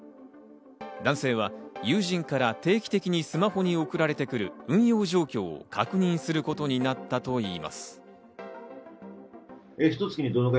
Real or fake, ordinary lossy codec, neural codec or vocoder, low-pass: real; none; none; none